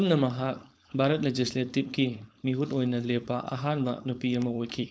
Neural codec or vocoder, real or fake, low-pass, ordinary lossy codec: codec, 16 kHz, 4.8 kbps, FACodec; fake; none; none